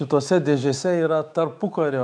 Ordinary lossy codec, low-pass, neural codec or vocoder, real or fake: MP3, 96 kbps; 9.9 kHz; autoencoder, 48 kHz, 128 numbers a frame, DAC-VAE, trained on Japanese speech; fake